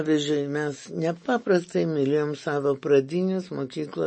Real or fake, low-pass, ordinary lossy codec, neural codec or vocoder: real; 9.9 kHz; MP3, 32 kbps; none